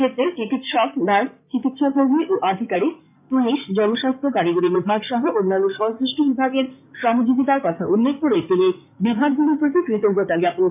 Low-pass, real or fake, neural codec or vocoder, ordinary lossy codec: 3.6 kHz; fake; codec, 16 kHz in and 24 kHz out, 2.2 kbps, FireRedTTS-2 codec; none